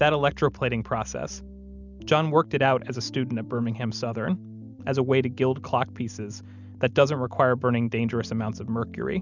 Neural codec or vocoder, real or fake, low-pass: none; real; 7.2 kHz